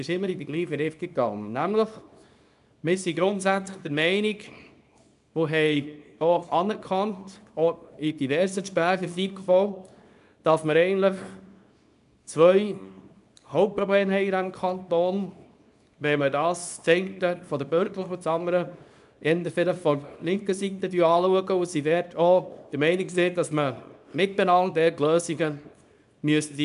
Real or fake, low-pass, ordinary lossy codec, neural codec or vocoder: fake; 10.8 kHz; none; codec, 24 kHz, 0.9 kbps, WavTokenizer, small release